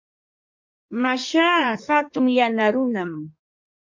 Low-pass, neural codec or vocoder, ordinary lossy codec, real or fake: 7.2 kHz; codec, 16 kHz in and 24 kHz out, 1.1 kbps, FireRedTTS-2 codec; MP3, 48 kbps; fake